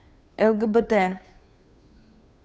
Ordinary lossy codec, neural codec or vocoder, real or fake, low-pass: none; codec, 16 kHz, 2 kbps, FunCodec, trained on Chinese and English, 25 frames a second; fake; none